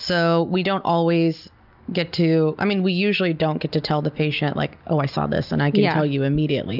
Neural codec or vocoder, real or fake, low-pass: none; real; 5.4 kHz